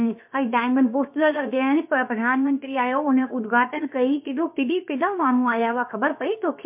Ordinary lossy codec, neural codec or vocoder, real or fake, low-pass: MP3, 32 kbps; codec, 16 kHz, 0.7 kbps, FocalCodec; fake; 3.6 kHz